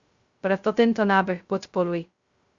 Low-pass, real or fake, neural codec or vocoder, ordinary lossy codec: 7.2 kHz; fake; codec, 16 kHz, 0.2 kbps, FocalCodec; Opus, 64 kbps